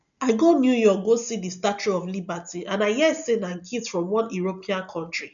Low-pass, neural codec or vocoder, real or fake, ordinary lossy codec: 7.2 kHz; none; real; none